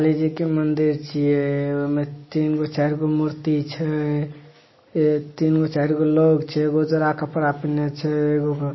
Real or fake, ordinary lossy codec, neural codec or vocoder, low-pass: real; MP3, 24 kbps; none; 7.2 kHz